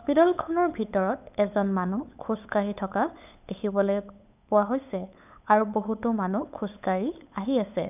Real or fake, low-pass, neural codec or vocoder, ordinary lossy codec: fake; 3.6 kHz; codec, 16 kHz, 8 kbps, FunCodec, trained on LibriTTS, 25 frames a second; none